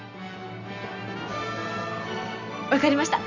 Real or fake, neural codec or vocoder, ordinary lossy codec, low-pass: real; none; none; 7.2 kHz